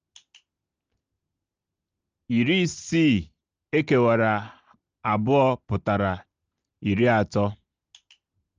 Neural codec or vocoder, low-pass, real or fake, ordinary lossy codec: none; 7.2 kHz; real; Opus, 24 kbps